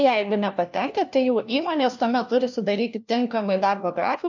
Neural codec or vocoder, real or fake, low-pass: codec, 16 kHz, 1 kbps, FunCodec, trained on LibriTTS, 50 frames a second; fake; 7.2 kHz